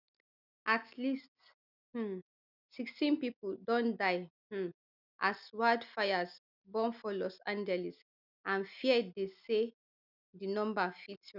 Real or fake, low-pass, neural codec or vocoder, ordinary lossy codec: real; 5.4 kHz; none; none